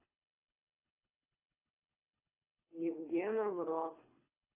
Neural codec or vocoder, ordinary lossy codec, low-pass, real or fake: codec, 24 kHz, 3 kbps, HILCodec; AAC, 32 kbps; 3.6 kHz; fake